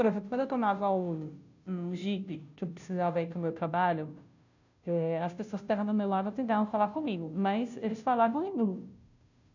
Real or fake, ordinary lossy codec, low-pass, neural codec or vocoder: fake; none; 7.2 kHz; codec, 16 kHz, 0.5 kbps, FunCodec, trained on Chinese and English, 25 frames a second